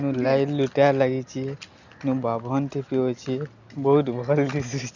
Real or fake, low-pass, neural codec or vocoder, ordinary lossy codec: real; 7.2 kHz; none; none